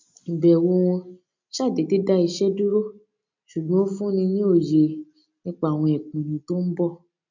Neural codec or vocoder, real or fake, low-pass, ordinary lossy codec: none; real; 7.2 kHz; MP3, 64 kbps